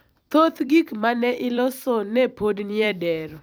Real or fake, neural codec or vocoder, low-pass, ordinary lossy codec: fake; vocoder, 44.1 kHz, 128 mel bands every 256 samples, BigVGAN v2; none; none